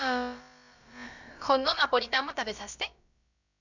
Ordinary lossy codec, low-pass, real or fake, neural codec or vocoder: none; 7.2 kHz; fake; codec, 16 kHz, about 1 kbps, DyCAST, with the encoder's durations